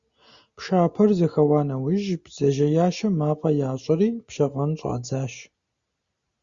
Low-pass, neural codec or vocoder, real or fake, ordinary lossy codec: 7.2 kHz; none; real; Opus, 64 kbps